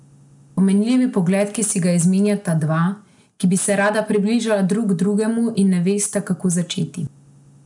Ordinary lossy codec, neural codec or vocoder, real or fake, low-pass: none; none; real; 10.8 kHz